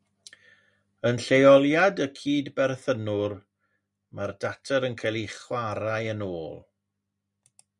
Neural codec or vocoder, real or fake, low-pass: none; real; 10.8 kHz